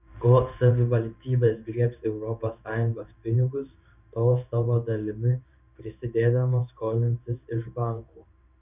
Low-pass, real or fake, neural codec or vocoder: 3.6 kHz; fake; codec, 16 kHz in and 24 kHz out, 1 kbps, XY-Tokenizer